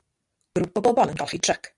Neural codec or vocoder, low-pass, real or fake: none; 10.8 kHz; real